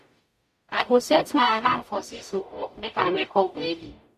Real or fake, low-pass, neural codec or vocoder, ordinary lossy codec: fake; 14.4 kHz; codec, 44.1 kHz, 0.9 kbps, DAC; MP3, 64 kbps